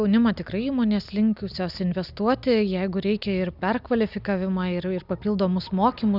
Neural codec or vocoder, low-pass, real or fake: none; 5.4 kHz; real